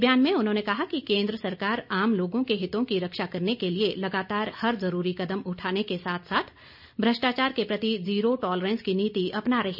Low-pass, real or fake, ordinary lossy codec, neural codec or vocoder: 5.4 kHz; real; none; none